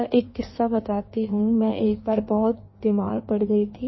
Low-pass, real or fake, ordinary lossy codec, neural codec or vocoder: 7.2 kHz; fake; MP3, 24 kbps; codec, 16 kHz in and 24 kHz out, 1.1 kbps, FireRedTTS-2 codec